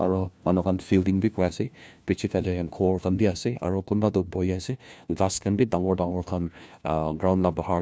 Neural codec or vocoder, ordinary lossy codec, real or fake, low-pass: codec, 16 kHz, 1 kbps, FunCodec, trained on LibriTTS, 50 frames a second; none; fake; none